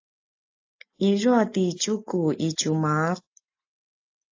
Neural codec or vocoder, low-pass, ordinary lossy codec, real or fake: none; 7.2 kHz; AAC, 48 kbps; real